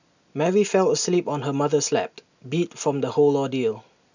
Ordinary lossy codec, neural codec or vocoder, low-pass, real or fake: none; none; 7.2 kHz; real